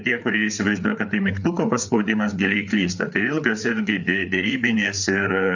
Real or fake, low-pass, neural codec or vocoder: fake; 7.2 kHz; codec, 16 kHz, 4 kbps, FreqCodec, larger model